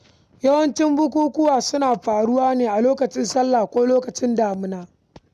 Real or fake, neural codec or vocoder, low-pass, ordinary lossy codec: real; none; 14.4 kHz; none